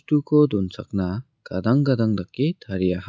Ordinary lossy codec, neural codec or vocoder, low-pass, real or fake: none; none; none; real